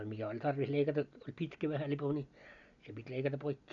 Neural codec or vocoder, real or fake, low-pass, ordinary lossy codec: none; real; 7.2 kHz; none